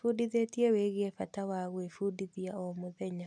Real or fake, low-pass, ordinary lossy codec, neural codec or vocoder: real; none; none; none